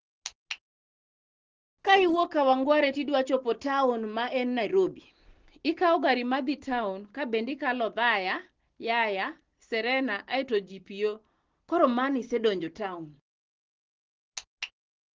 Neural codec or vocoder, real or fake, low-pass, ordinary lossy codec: none; real; 7.2 kHz; Opus, 16 kbps